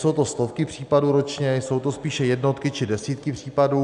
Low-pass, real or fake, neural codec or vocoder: 10.8 kHz; real; none